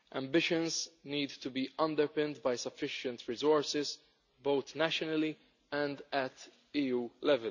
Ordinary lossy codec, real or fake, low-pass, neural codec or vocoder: none; real; 7.2 kHz; none